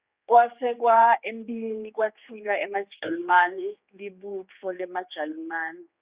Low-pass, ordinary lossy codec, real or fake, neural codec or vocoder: 3.6 kHz; Opus, 64 kbps; fake; codec, 16 kHz, 4 kbps, X-Codec, HuBERT features, trained on general audio